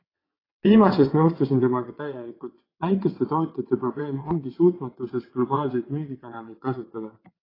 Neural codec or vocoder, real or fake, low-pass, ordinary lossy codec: vocoder, 22.05 kHz, 80 mel bands, WaveNeXt; fake; 5.4 kHz; AAC, 24 kbps